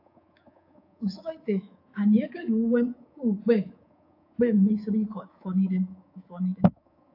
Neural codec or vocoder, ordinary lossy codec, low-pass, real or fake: codec, 24 kHz, 3.1 kbps, DualCodec; AAC, 32 kbps; 5.4 kHz; fake